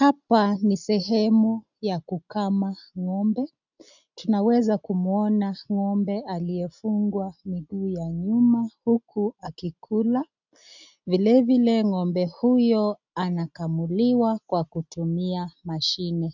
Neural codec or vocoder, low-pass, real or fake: none; 7.2 kHz; real